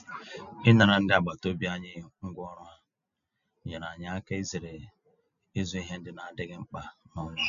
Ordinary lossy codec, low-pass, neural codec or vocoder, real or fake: none; 7.2 kHz; none; real